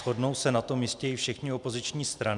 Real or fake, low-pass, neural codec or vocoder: real; 10.8 kHz; none